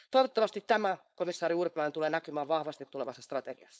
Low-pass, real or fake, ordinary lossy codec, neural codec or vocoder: none; fake; none; codec, 16 kHz, 4.8 kbps, FACodec